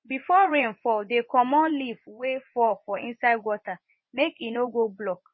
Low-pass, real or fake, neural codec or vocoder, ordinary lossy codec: 7.2 kHz; fake; vocoder, 24 kHz, 100 mel bands, Vocos; MP3, 24 kbps